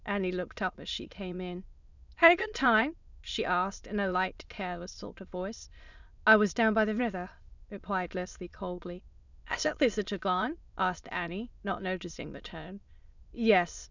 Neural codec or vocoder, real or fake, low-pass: autoencoder, 22.05 kHz, a latent of 192 numbers a frame, VITS, trained on many speakers; fake; 7.2 kHz